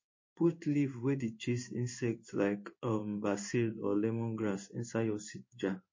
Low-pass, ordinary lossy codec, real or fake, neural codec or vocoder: 7.2 kHz; MP3, 32 kbps; fake; codec, 16 kHz in and 24 kHz out, 1 kbps, XY-Tokenizer